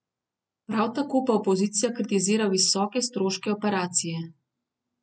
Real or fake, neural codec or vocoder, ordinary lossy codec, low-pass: real; none; none; none